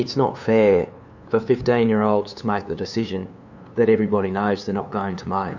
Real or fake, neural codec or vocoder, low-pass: fake; codec, 16 kHz, 2 kbps, FunCodec, trained on LibriTTS, 25 frames a second; 7.2 kHz